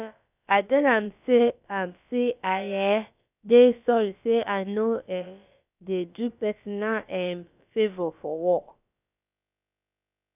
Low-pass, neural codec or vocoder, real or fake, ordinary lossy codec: 3.6 kHz; codec, 16 kHz, about 1 kbps, DyCAST, with the encoder's durations; fake; none